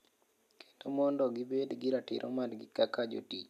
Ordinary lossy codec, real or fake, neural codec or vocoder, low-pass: none; real; none; 14.4 kHz